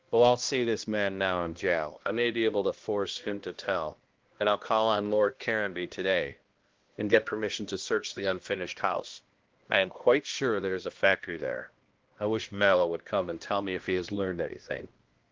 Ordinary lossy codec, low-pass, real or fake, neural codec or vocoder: Opus, 16 kbps; 7.2 kHz; fake; codec, 16 kHz, 1 kbps, X-Codec, HuBERT features, trained on balanced general audio